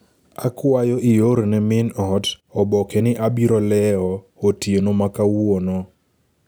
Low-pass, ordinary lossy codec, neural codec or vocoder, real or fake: none; none; none; real